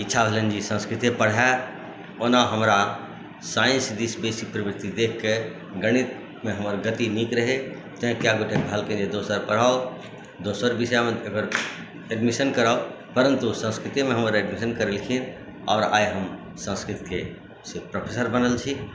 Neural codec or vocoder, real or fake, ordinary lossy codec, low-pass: none; real; none; none